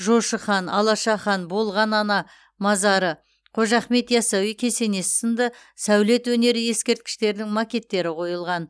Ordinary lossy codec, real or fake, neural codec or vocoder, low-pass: none; real; none; none